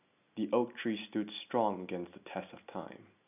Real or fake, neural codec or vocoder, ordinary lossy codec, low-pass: real; none; none; 3.6 kHz